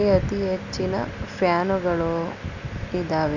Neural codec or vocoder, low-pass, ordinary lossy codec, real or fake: none; 7.2 kHz; none; real